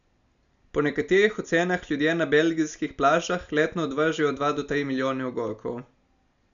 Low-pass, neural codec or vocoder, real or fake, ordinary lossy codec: 7.2 kHz; none; real; none